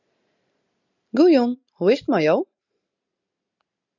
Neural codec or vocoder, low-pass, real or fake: none; 7.2 kHz; real